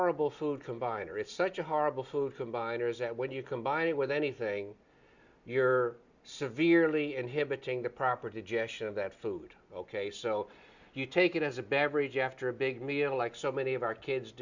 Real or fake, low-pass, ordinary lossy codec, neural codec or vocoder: real; 7.2 kHz; Opus, 64 kbps; none